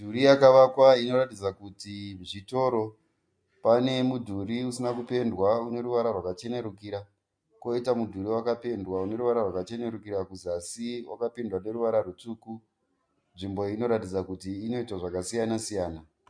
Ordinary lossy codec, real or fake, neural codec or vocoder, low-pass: MP3, 48 kbps; real; none; 9.9 kHz